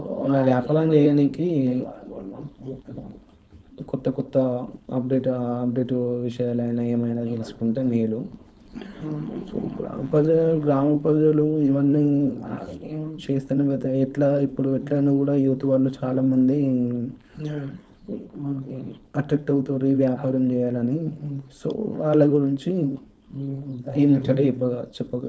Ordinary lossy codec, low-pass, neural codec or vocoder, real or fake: none; none; codec, 16 kHz, 4.8 kbps, FACodec; fake